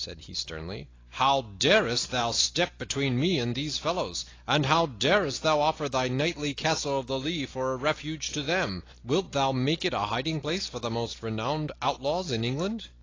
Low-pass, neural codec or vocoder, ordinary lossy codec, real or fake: 7.2 kHz; none; AAC, 32 kbps; real